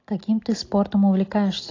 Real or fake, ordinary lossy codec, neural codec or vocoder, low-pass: real; AAC, 32 kbps; none; 7.2 kHz